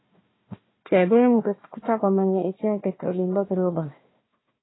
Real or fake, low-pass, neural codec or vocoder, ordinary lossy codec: fake; 7.2 kHz; codec, 16 kHz, 1 kbps, FunCodec, trained on Chinese and English, 50 frames a second; AAC, 16 kbps